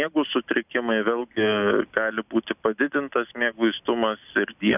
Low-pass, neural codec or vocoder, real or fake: 3.6 kHz; none; real